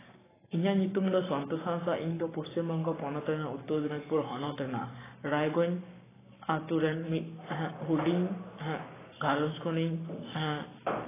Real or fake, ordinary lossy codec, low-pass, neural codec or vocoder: fake; AAC, 16 kbps; 3.6 kHz; codec, 44.1 kHz, 7.8 kbps, Pupu-Codec